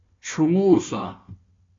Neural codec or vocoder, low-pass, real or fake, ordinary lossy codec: codec, 16 kHz, 1 kbps, FunCodec, trained on Chinese and English, 50 frames a second; 7.2 kHz; fake; AAC, 32 kbps